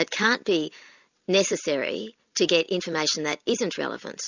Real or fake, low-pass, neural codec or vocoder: real; 7.2 kHz; none